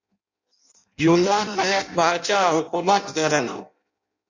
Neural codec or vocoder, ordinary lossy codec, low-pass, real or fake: codec, 16 kHz in and 24 kHz out, 0.6 kbps, FireRedTTS-2 codec; MP3, 64 kbps; 7.2 kHz; fake